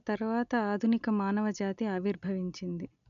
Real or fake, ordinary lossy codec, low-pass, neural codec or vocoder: real; none; 7.2 kHz; none